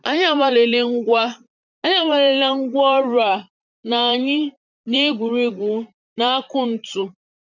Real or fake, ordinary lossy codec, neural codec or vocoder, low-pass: fake; none; vocoder, 44.1 kHz, 128 mel bands, Pupu-Vocoder; 7.2 kHz